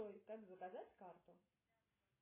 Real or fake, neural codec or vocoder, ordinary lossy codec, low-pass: real; none; MP3, 16 kbps; 3.6 kHz